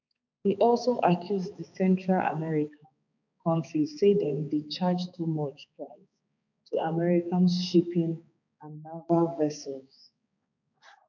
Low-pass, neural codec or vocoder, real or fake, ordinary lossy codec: 7.2 kHz; codec, 16 kHz, 4 kbps, X-Codec, HuBERT features, trained on balanced general audio; fake; none